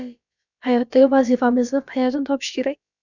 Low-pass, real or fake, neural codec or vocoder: 7.2 kHz; fake; codec, 16 kHz, about 1 kbps, DyCAST, with the encoder's durations